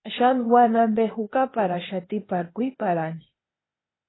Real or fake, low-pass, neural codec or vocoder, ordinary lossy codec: fake; 7.2 kHz; codec, 16 kHz, 0.8 kbps, ZipCodec; AAC, 16 kbps